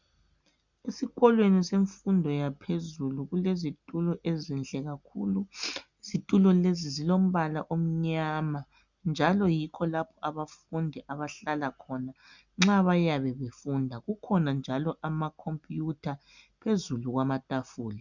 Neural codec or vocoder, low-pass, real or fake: none; 7.2 kHz; real